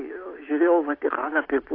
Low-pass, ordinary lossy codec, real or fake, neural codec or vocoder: 5.4 kHz; AAC, 24 kbps; real; none